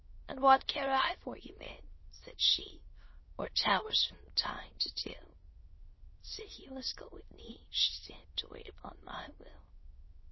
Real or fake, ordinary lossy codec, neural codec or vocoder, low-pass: fake; MP3, 24 kbps; autoencoder, 22.05 kHz, a latent of 192 numbers a frame, VITS, trained on many speakers; 7.2 kHz